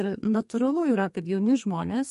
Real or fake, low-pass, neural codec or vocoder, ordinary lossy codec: fake; 14.4 kHz; codec, 32 kHz, 1.9 kbps, SNAC; MP3, 48 kbps